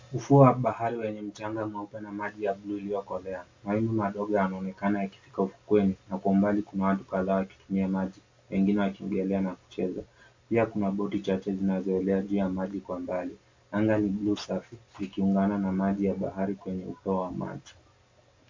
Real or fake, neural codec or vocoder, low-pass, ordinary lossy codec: real; none; 7.2 kHz; MP3, 48 kbps